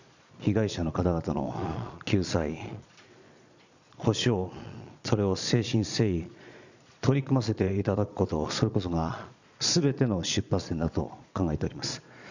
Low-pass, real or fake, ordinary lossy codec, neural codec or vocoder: 7.2 kHz; fake; none; vocoder, 22.05 kHz, 80 mel bands, Vocos